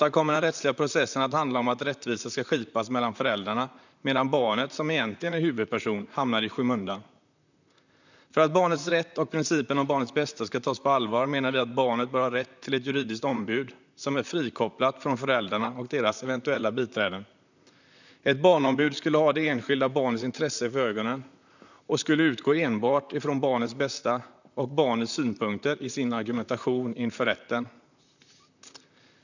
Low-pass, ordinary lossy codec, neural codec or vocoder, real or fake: 7.2 kHz; none; vocoder, 44.1 kHz, 128 mel bands, Pupu-Vocoder; fake